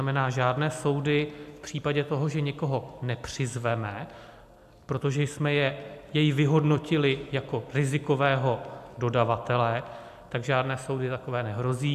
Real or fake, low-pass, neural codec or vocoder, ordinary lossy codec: real; 14.4 kHz; none; AAC, 96 kbps